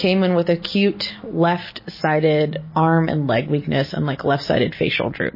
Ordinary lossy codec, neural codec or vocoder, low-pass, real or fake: MP3, 24 kbps; none; 5.4 kHz; real